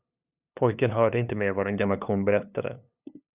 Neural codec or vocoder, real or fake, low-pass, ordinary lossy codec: codec, 16 kHz, 2 kbps, FunCodec, trained on LibriTTS, 25 frames a second; fake; 3.6 kHz; Opus, 64 kbps